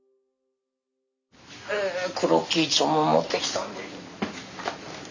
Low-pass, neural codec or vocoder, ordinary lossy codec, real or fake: 7.2 kHz; none; none; real